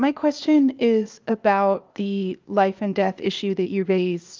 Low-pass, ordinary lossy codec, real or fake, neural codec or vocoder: 7.2 kHz; Opus, 24 kbps; fake; codec, 16 kHz, 0.8 kbps, ZipCodec